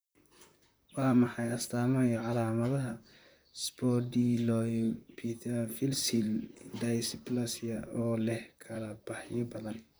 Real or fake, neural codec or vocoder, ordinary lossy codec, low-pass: fake; vocoder, 44.1 kHz, 128 mel bands, Pupu-Vocoder; none; none